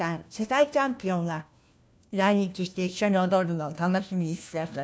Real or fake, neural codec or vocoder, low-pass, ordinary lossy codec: fake; codec, 16 kHz, 1 kbps, FunCodec, trained on LibriTTS, 50 frames a second; none; none